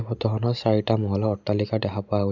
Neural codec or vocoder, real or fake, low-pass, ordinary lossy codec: none; real; 7.2 kHz; none